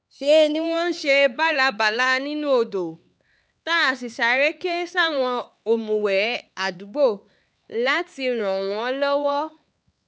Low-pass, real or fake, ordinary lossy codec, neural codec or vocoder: none; fake; none; codec, 16 kHz, 4 kbps, X-Codec, HuBERT features, trained on LibriSpeech